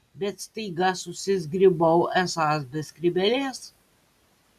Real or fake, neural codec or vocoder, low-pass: real; none; 14.4 kHz